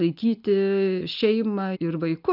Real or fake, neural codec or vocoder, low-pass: real; none; 5.4 kHz